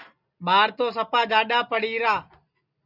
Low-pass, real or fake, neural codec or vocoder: 5.4 kHz; real; none